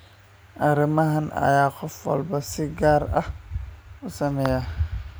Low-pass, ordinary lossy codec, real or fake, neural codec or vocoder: none; none; real; none